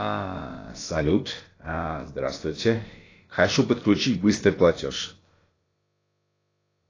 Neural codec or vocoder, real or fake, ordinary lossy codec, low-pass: codec, 16 kHz, about 1 kbps, DyCAST, with the encoder's durations; fake; AAC, 32 kbps; 7.2 kHz